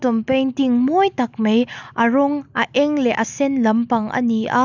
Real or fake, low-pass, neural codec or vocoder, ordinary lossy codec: real; 7.2 kHz; none; none